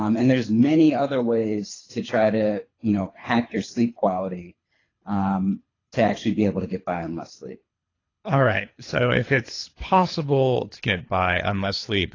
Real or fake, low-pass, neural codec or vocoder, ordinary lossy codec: fake; 7.2 kHz; codec, 24 kHz, 3 kbps, HILCodec; AAC, 32 kbps